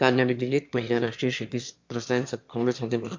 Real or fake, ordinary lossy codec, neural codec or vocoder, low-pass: fake; MP3, 64 kbps; autoencoder, 22.05 kHz, a latent of 192 numbers a frame, VITS, trained on one speaker; 7.2 kHz